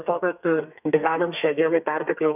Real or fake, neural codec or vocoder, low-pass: fake; codec, 32 kHz, 1.9 kbps, SNAC; 3.6 kHz